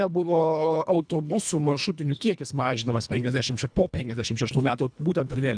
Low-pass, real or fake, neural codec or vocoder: 9.9 kHz; fake; codec, 24 kHz, 1.5 kbps, HILCodec